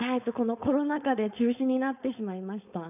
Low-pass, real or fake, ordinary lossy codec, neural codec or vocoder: 3.6 kHz; fake; none; codec, 16 kHz, 4.8 kbps, FACodec